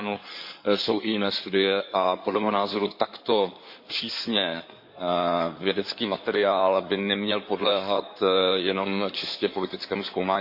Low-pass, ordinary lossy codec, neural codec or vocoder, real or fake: 5.4 kHz; MP3, 32 kbps; codec, 16 kHz in and 24 kHz out, 2.2 kbps, FireRedTTS-2 codec; fake